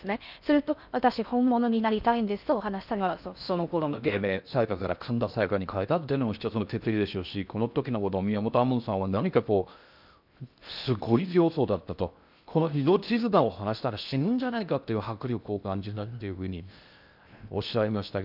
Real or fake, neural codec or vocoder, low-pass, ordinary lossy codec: fake; codec, 16 kHz in and 24 kHz out, 0.6 kbps, FocalCodec, streaming, 4096 codes; 5.4 kHz; none